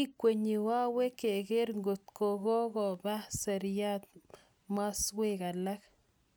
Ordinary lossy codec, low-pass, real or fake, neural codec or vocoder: none; none; real; none